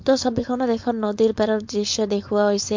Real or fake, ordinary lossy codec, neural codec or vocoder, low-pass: fake; MP3, 48 kbps; codec, 16 kHz, 4.8 kbps, FACodec; 7.2 kHz